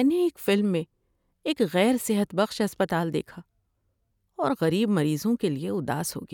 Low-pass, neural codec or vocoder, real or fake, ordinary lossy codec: 19.8 kHz; none; real; none